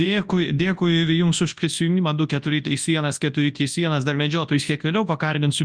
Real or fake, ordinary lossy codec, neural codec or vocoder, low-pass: fake; Opus, 32 kbps; codec, 24 kHz, 0.9 kbps, WavTokenizer, large speech release; 9.9 kHz